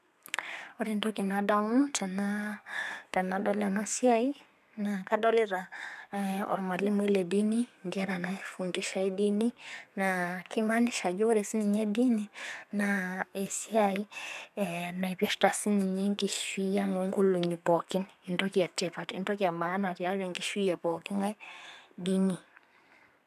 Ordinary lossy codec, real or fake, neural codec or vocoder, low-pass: none; fake; codec, 32 kHz, 1.9 kbps, SNAC; 14.4 kHz